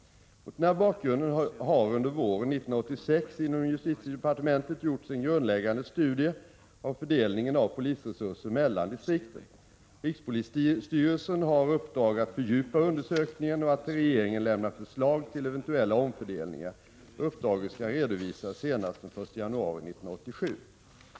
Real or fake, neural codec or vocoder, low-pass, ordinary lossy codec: real; none; none; none